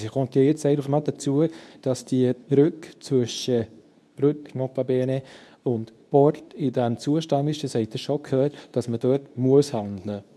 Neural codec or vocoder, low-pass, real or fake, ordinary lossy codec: codec, 24 kHz, 0.9 kbps, WavTokenizer, medium speech release version 2; none; fake; none